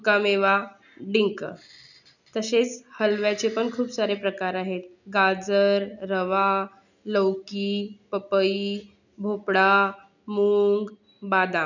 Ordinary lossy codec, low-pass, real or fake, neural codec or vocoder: none; 7.2 kHz; real; none